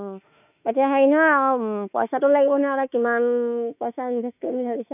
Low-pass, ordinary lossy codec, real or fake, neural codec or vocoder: 3.6 kHz; none; fake; autoencoder, 48 kHz, 32 numbers a frame, DAC-VAE, trained on Japanese speech